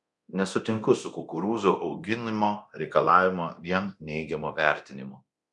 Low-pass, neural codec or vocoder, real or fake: 10.8 kHz; codec, 24 kHz, 0.9 kbps, DualCodec; fake